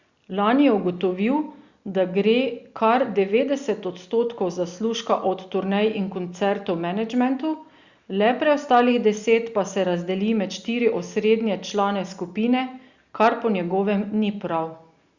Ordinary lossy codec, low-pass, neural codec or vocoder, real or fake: Opus, 64 kbps; 7.2 kHz; none; real